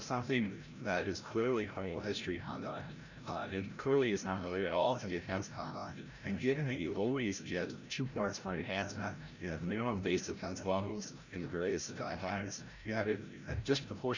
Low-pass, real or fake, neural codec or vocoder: 7.2 kHz; fake; codec, 16 kHz, 0.5 kbps, FreqCodec, larger model